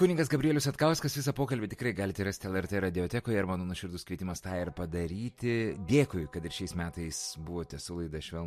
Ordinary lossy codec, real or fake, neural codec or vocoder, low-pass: MP3, 64 kbps; real; none; 14.4 kHz